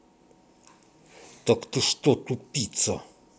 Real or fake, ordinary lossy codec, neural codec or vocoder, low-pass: real; none; none; none